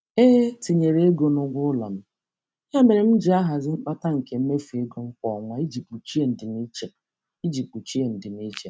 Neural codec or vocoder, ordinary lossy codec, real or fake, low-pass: none; none; real; none